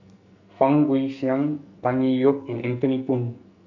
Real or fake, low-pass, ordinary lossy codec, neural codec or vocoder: fake; 7.2 kHz; none; codec, 44.1 kHz, 2.6 kbps, SNAC